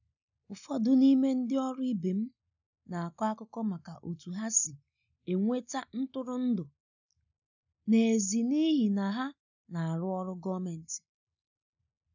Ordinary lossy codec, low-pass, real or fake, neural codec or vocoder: none; 7.2 kHz; real; none